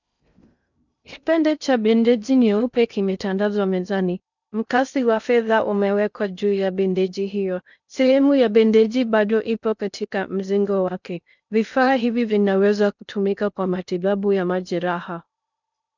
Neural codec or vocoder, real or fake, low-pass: codec, 16 kHz in and 24 kHz out, 0.6 kbps, FocalCodec, streaming, 2048 codes; fake; 7.2 kHz